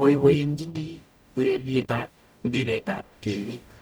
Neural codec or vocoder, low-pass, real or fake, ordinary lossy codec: codec, 44.1 kHz, 0.9 kbps, DAC; none; fake; none